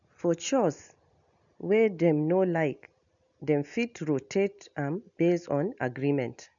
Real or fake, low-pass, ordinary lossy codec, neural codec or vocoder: real; 7.2 kHz; none; none